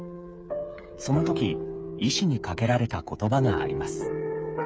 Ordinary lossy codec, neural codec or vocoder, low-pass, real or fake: none; codec, 16 kHz, 8 kbps, FreqCodec, smaller model; none; fake